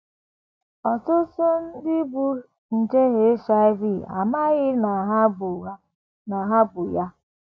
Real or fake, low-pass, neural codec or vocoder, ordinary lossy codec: real; none; none; none